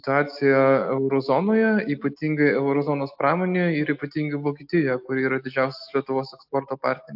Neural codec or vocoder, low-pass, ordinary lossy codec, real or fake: none; 5.4 kHz; AAC, 48 kbps; real